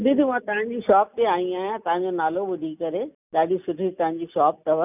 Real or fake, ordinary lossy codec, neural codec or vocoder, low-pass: real; none; none; 3.6 kHz